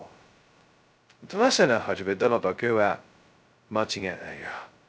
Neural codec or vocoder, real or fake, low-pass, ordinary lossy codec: codec, 16 kHz, 0.2 kbps, FocalCodec; fake; none; none